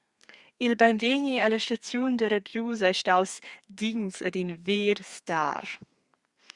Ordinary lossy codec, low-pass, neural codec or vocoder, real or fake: Opus, 64 kbps; 10.8 kHz; codec, 32 kHz, 1.9 kbps, SNAC; fake